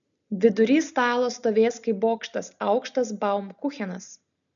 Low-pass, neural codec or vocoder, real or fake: 7.2 kHz; none; real